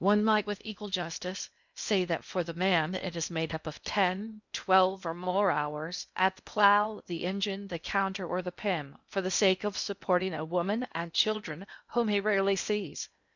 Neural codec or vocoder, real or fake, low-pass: codec, 16 kHz in and 24 kHz out, 0.8 kbps, FocalCodec, streaming, 65536 codes; fake; 7.2 kHz